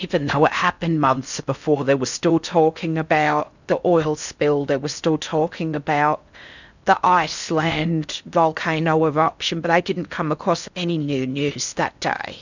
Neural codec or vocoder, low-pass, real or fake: codec, 16 kHz in and 24 kHz out, 0.6 kbps, FocalCodec, streaming, 4096 codes; 7.2 kHz; fake